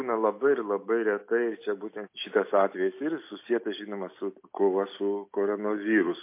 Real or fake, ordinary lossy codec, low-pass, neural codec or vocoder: real; MP3, 24 kbps; 3.6 kHz; none